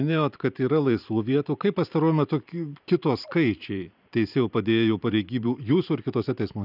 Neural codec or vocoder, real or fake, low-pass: vocoder, 44.1 kHz, 128 mel bands every 512 samples, BigVGAN v2; fake; 5.4 kHz